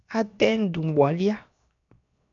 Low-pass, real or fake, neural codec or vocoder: 7.2 kHz; fake; codec, 16 kHz, 0.7 kbps, FocalCodec